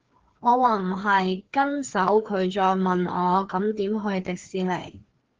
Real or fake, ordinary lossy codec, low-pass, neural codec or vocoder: fake; Opus, 16 kbps; 7.2 kHz; codec, 16 kHz, 2 kbps, FreqCodec, larger model